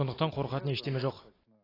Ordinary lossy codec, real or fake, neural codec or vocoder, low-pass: AAC, 24 kbps; real; none; 5.4 kHz